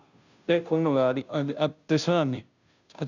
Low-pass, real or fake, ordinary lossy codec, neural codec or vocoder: 7.2 kHz; fake; none; codec, 16 kHz, 0.5 kbps, FunCodec, trained on Chinese and English, 25 frames a second